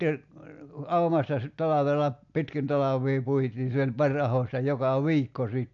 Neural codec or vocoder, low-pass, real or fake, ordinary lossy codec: none; 7.2 kHz; real; AAC, 64 kbps